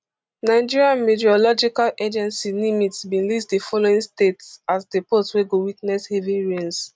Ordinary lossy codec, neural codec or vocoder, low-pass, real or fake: none; none; none; real